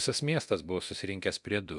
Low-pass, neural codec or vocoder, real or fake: 10.8 kHz; codec, 24 kHz, 0.9 kbps, DualCodec; fake